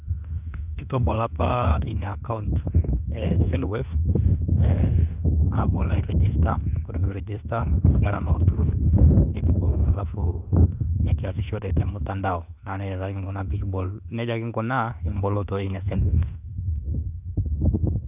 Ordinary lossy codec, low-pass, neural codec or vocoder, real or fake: none; 3.6 kHz; autoencoder, 48 kHz, 32 numbers a frame, DAC-VAE, trained on Japanese speech; fake